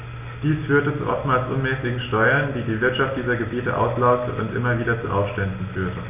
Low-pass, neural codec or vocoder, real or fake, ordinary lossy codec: 3.6 kHz; none; real; none